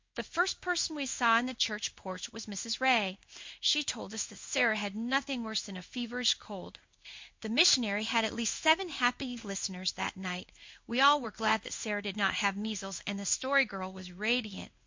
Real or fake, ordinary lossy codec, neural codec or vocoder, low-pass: fake; MP3, 48 kbps; codec, 16 kHz in and 24 kHz out, 1 kbps, XY-Tokenizer; 7.2 kHz